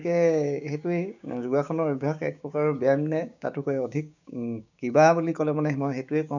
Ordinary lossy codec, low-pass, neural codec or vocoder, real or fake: none; 7.2 kHz; codec, 16 kHz, 6 kbps, DAC; fake